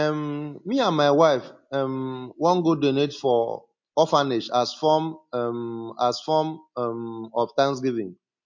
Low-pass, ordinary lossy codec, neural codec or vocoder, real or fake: 7.2 kHz; MP3, 48 kbps; none; real